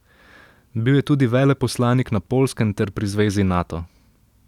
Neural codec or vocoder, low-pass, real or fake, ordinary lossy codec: none; 19.8 kHz; real; none